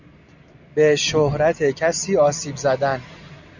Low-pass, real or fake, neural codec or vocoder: 7.2 kHz; real; none